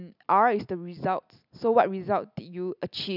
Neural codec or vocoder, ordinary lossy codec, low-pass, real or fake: none; none; 5.4 kHz; real